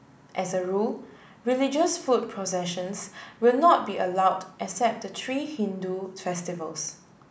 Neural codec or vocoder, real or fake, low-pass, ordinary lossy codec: none; real; none; none